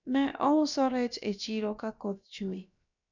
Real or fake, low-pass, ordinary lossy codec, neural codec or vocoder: fake; 7.2 kHz; none; codec, 16 kHz, about 1 kbps, DyCAST, with the encoder's durations